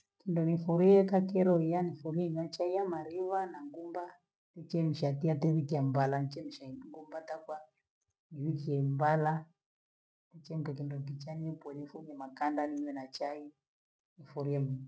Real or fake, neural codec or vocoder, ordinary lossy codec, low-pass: real; none; none; none